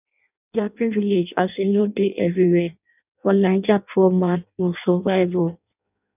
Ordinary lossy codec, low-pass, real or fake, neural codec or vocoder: none; 3.6 kHz; fake; codec, 16 kHz in and 24 kHz out, 0.6 kbps, FireRedTTS-2 codec